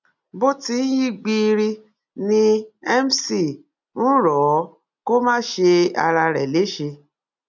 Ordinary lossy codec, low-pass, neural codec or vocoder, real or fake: none; 7.2 kHz; none; real